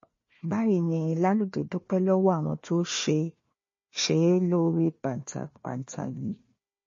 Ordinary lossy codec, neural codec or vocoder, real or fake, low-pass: MP3, 32 kbps; codec, 16 kHz, 1 kbps, FunCodec, trained on Chinese and English, 50 frames a second; fake; 7.2 kHz